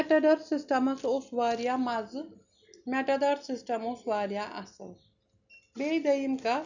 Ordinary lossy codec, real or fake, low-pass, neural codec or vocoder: none; real; 7.2 kHz; none